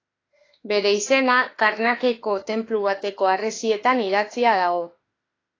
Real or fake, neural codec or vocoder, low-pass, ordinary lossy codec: fake; autoencoder, 48 kHz, 32 numbers a frame, DAC-VAE, trained on Japanese speech; 7.2 kHz; AAC, 32 kbps